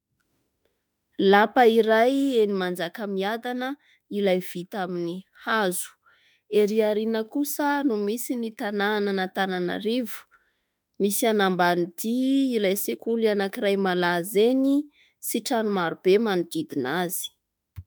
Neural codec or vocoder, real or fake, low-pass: autoencoder, 48 kHz, 32 numbers a frame, DAC-VAE, trained on Japanese speech; fake; 19.8 kHz